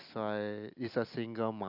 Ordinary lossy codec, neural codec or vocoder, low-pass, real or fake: none; none; 5.4 kHz; real